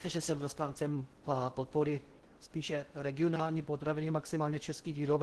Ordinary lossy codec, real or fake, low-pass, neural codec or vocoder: Opus, 16 kbps; fake; 10.8 kHz; codec, 16 kHz in and 24 kHz out, 0.6 kbps, FocalCodec, streaming, 4096 codes